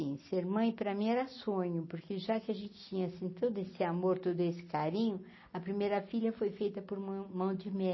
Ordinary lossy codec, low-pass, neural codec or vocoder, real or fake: MP3, 24 kbps; 7.2 kHz; none; real